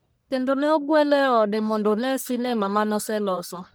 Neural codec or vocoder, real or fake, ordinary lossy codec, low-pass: codec, 44.1 kHz, 1.7 kbps, Pupu-Codec; fake; none; none